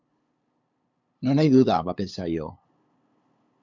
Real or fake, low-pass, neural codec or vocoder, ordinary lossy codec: fake; 7.2 kHz; codec, 16 kHz, 8 kbps, FunCodec, trained on LibriTTS, 25 frames a second; MP3, 64 kbps